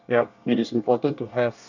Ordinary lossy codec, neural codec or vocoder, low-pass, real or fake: none; codec, 24 kHz, 1 kbps, SNAC; 7.2 kHz; fake